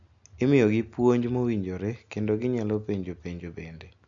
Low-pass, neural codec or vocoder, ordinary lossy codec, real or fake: 7.2 kHz; none; MP3, 64 kbps; real